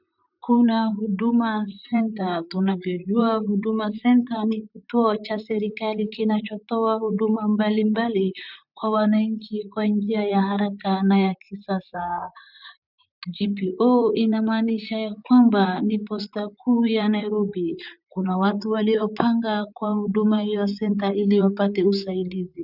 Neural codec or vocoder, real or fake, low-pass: vocoder, 44.1 kHz, 128 mel bands, Pupu-Vocoder; fake; 5.4 kHz